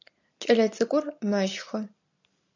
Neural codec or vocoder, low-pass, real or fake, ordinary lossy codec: none; 7.2 kHz; real; AAC, 48 kbps